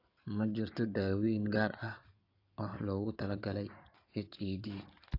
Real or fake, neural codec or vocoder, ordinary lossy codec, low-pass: fake; codec, 16 kHz in and 24 kHz out, 2.2 kbps, FireRedTTS-2 codec; AAC, 32 kbps; 5.4 kHz